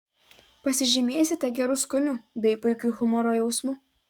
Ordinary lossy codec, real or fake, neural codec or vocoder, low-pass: Opus, 64 kbps; fake; codec, 44.1 kHz, 7.8 kbps, DAC; 19.8 kHz